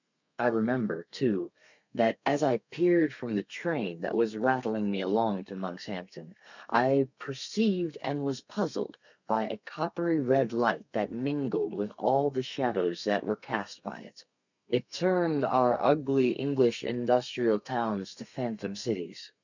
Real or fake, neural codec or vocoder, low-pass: fake; codec, 32 kHz, 1.9 kbps, SNAC; 7.2 kHz